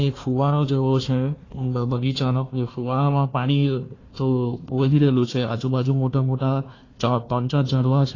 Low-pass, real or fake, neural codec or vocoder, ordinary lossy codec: 7.2 kHz; fake; codec, 16 kHz, 1 kbps, FunCodec, trained on Chinese and English, 50 frames a second; AAC, 32 kbps